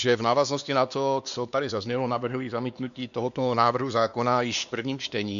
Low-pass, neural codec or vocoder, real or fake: 7.2 kHz; codec, 16 kHz, 2 kbps, X-Codec, WavLM features, trained on Multilingual LibriSpeech; fake